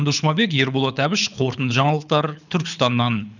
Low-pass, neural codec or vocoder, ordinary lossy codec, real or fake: 7.2 kHz; codec, 24 kHz, 6 kbps, HILCodec; none; fake